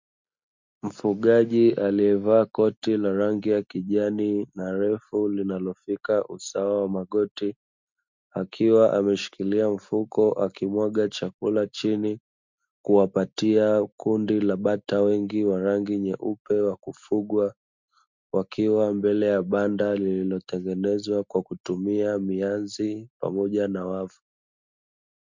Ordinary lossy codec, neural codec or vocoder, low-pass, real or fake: MP3, 64 kbps; none; 7.2 kHz; real